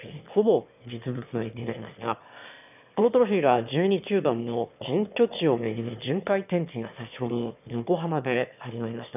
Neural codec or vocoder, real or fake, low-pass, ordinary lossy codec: autoencoder, 22.05 kHz, a latent of 192 numbers a frame, VITS, trained on one speaker; fake; 3.6 kHz; none